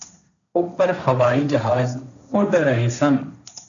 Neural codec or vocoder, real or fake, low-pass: codec, 16 kHz, 1.1 kbps, Voila-Tokenizer; fake; 7.2 kHz